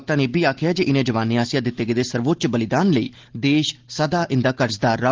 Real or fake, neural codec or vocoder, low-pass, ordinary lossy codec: real; none; 7.2 kHz; Opus, 16 kbps